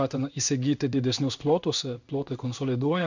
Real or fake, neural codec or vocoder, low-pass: fake; codec, 16 kHz in and 24 kHz out, 1 kbps, XY-Tokenizer; 7.2 kHz